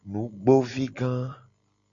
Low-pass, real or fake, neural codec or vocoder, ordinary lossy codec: 7.2 kHz; real; none; Opus, 64 kbps